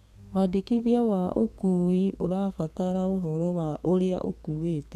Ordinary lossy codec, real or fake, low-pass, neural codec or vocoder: none; fake; 14.4 kHz; codec, 32 kHz, 1.9 kbps, SNAC